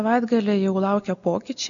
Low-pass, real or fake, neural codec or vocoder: 7.2 kHz; real; none